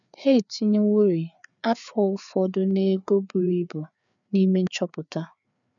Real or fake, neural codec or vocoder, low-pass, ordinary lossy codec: fake; codec, 16 kHz, 4 kbps, FreqCodec, larger model; 7.2 kHz; none